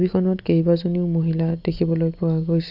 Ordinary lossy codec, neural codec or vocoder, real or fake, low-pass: Opus, 64 kbps; none; real; 5.4 kHz